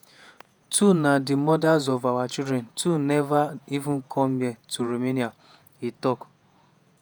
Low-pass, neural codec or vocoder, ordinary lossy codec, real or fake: none; vocoder, 48 kHz, 128 mel bands, Vocos; none; fake